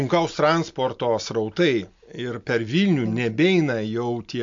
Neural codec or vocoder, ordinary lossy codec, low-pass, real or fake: none; MP3, 48 kbps; 7.2 kHz; real